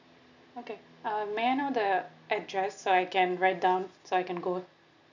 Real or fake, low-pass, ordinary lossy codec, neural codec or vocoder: real; 7.2 kHz; none; none